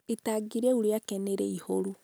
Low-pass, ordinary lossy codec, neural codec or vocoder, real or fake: none; none; none; real